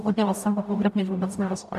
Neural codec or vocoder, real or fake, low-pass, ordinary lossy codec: codec, 44.1 kHz, 0.9 kbps, DAC; fake; 14.4 kHz; AAC, 96 kbps